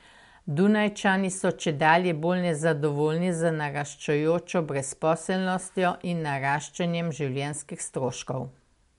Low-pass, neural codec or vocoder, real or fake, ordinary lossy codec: 10.8 kHz; none; real; MP3, 64 kbps